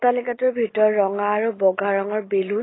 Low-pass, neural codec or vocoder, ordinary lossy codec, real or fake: 7.2 kHz; none; AAC, 16 kbps; real